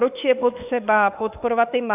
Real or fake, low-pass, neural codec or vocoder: fake; 3.6 kHz; autoencoder, 48 kHz, 32 numbers a frame, DAC-VAE, trained on Japanese speech